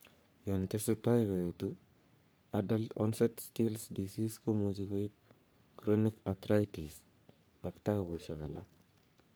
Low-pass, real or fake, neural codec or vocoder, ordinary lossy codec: none; fake; codec, 44.1 kHz, 3.4 kbps, Pupu-Codec; none